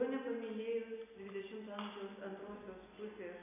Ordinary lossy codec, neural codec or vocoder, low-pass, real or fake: AAC, 32 kbps; none; 3.6 kHz; real